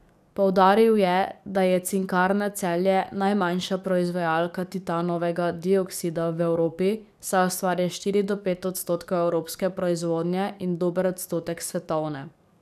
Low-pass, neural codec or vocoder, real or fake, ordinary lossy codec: 14.4 kHz; codec, 44.1 kHz, 7.8 kbps, DAC; fake; none